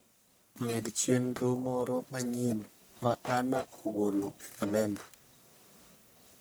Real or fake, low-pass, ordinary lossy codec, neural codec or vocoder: fake; none; none; codec, 44.1 kHz, 1.7 kbps, Pupu-Codec